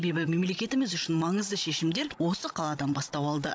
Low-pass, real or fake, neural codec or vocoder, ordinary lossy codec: none; fake; codec, 16 kHz, 16 kbps, FreqCodec, larger model; none